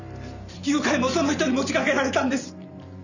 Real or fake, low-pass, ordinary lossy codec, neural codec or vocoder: fake; 7.2 kHz; none; vocoder, 44.1 kHz, 128 mel bands every 512 samples, BigVGAN v2